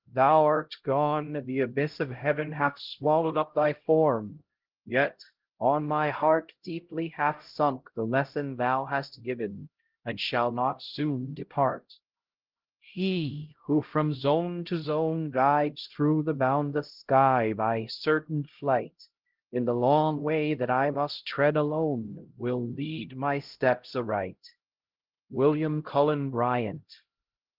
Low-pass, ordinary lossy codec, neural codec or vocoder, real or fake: 5.4 kHz; Opus, 16 kbps; codec, 16 kHz, 0.5 kbps, X-Codec, HuBERT features, trained on LibriSpeech; fake